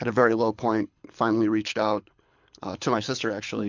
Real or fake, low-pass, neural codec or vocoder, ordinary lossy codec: fake; 7.2 kHz; codec, 24 kHz, 6 kbps, HILCodec; MP3, 64 kbps